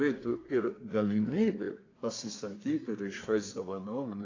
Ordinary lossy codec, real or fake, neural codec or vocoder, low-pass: AAC, 32 kbps; fake; codec, 24 kHz, 1 kbps, SNAC; 7.2 kHz